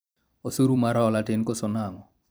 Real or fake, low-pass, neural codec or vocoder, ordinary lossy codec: fake; none; vocoder, 44.1 kHz, 128 mel bands every 256 samples, BigVGAN v2; none